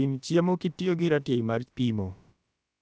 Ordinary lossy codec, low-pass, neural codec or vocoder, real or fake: none; none; codec, 16 kHz, about 1 kbps, DyCAST, with the encoder's durations; fake